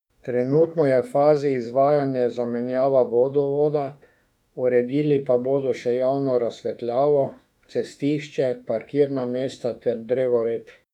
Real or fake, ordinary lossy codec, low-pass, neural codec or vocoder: fake; none; 19.8 kHz; autoencoder, 48 kHz, 32 numbers a frame, DAC-VAE, trained on Japanese speech